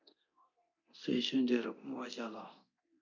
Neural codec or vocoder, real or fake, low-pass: codec, 24 kHz, 0.9 kbps, DualCodec; fake; 7.2 kHz